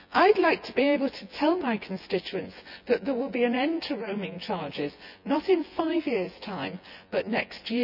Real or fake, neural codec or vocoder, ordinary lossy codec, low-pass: fake; vocoder, 24 kHz, 100 mel bands, Vocos; none; 5.4 kHz